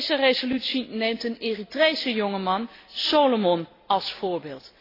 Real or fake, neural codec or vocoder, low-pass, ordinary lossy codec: real; none; 5.4 kHz; AAC, 24 kbps